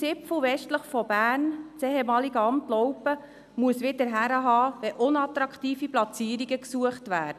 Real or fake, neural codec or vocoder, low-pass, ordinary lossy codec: real; none; 14.4 kHz; none